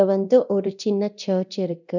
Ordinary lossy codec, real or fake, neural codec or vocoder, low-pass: none; fake; codec, 24 kHz, 0.9 kbps, DualCodec; 7.2 kHz